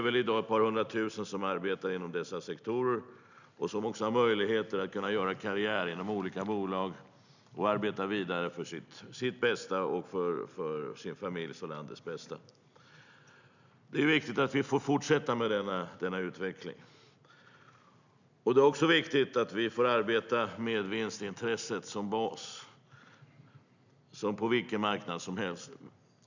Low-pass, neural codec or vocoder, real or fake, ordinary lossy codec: 7.2 kHz; none; real; none